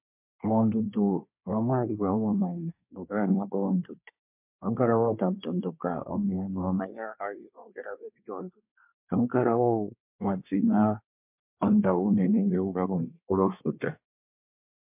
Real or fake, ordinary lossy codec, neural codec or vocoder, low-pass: fake; MP3, 32 kbps; codec, 24 kHz, 1 kbps, SNAC; 3.6 kHz